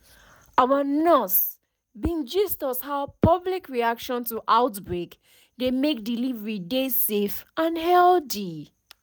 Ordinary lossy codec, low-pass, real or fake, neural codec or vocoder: none; none; real; none